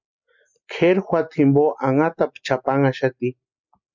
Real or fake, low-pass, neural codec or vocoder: real; 7.2 kHz; none